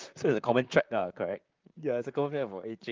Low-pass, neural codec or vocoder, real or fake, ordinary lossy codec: 7.2 kHz; vocoder, 44.1 kHz, 128 mel bands, Pupu-Vocoder; fake; Opus, 24 kbps